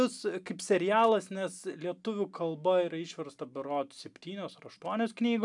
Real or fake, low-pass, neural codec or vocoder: real; 10.8 kHz; none